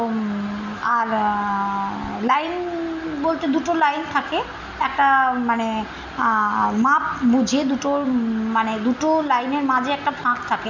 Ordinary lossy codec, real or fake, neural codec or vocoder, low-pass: none; real; none; 7.2 kHz